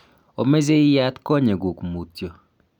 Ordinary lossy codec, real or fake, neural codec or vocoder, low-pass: none; real; none; 19.8 kHz